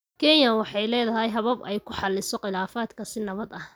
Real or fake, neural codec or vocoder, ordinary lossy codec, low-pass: real; none; none; none